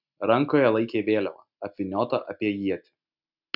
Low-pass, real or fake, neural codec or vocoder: 5.4 kHz; real; none